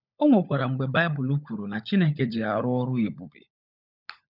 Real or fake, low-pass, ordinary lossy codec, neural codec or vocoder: fake; 5.4 kHz; none; codec, 16 kHz, 16 kbps, FunCodec, trained on LibriTTS, 50 frames a second